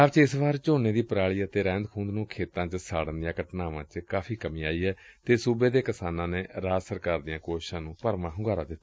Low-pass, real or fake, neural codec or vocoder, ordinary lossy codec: none; real; none; none